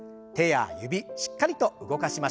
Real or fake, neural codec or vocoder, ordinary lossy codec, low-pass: real; none; none; none